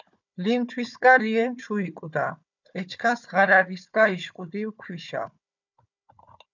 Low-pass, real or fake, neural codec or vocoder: 7.2 kHz; fake; codec, 16 kHz, 4 kbps, FunCodec, trained on Chinese and English, 50 frames a second